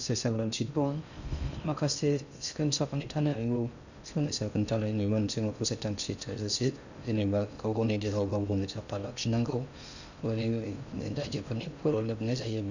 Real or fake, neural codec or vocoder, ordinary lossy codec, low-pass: fake; codec, 16 kHz in and 24 kHz out, 0.6 kbps, FocalCodec, streaming, 2048 codes; none; 7.2 kHz